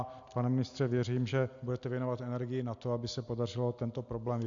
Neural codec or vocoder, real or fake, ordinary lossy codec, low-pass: none; real; AAC, 64 kbps; 7.2 kHz